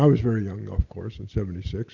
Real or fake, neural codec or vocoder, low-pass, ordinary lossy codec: real; none; 7.2 kHz; Opus, 64 kbps